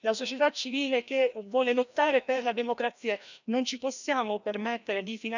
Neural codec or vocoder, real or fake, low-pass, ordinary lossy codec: codec, 16 kHz, 1 kbps, FreqCodec, larger model; fake; 7.2 kHz; none